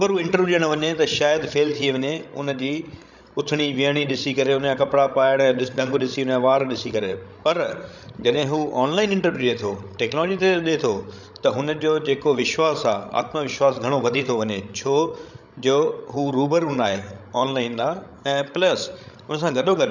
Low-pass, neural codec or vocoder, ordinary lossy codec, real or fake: 7.2 kHz; codec, 16 kHz, 16 kbps, FreqCodec, larger model; none; fake